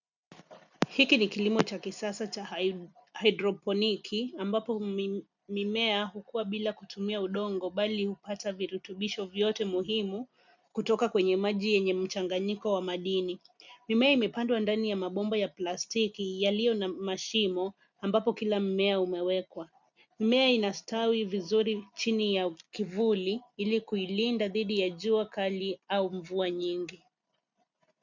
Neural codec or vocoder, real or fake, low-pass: none; real; 7.2 kHz